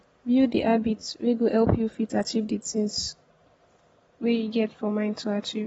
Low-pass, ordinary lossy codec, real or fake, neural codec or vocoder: 19.8 kHz; AAC, 24 kbps; real; none